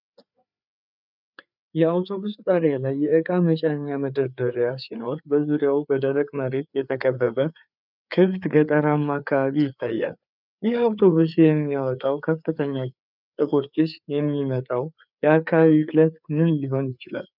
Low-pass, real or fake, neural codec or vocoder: 5.4 kHz; fake; codec, 16 kHz, 4 kbps, FreqCodec, larger model